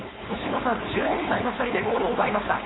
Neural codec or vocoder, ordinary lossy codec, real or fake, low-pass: codec, 16 kHz, 4.8 kbps, FACodec; AAC, 16 kbps; fake; 7.2 kHz